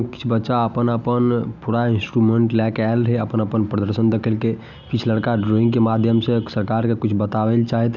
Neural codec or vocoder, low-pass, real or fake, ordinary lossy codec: none; 7.2 kHz; real; none